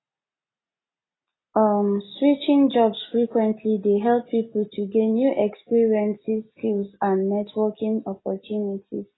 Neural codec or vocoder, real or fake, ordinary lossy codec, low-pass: none; real; AAC, 16 kbps; 7.2 kHz